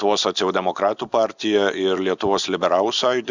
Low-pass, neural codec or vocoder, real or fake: 7.2 kHz; none; real